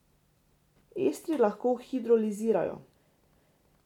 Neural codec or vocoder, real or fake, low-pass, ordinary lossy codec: none; real; 19.8 kHz; none